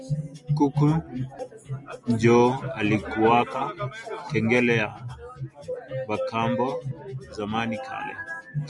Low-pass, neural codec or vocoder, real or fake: 10.8 kHz; none; real